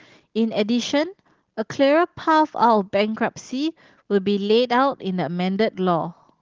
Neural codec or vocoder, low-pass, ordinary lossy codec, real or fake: none; 7.2 kHz; Opus, 16 kbps; real